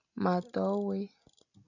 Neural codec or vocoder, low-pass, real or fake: none; 7.2 kHz; real